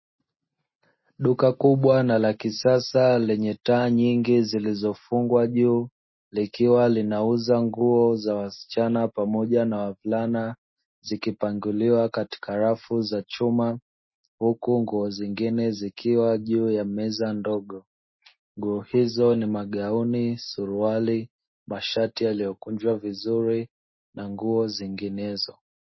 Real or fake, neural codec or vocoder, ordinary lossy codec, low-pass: real; none; MP3, 24 kbps; 7.2 kHz